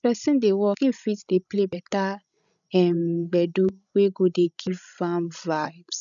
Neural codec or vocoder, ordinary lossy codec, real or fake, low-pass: codec, 16 kHz, 16 kbps, FreqCodec, larger model; none; fake; 7.2 kHz